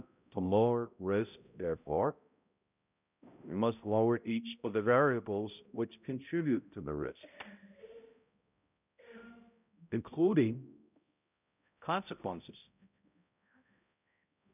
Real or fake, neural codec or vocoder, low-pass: fake; codec, 16 kHz, 0.5 kbps, X-Codec, HuBERT features, trained on balanced general audio; 3.6 kHz